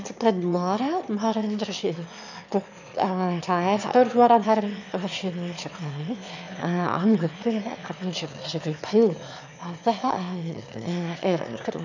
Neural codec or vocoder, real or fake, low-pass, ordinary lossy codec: autoencoder, 22.05 kHz, a latent of 192 numbers a frame, VITS, trained on one speaker; fake; 7.2 kHz; none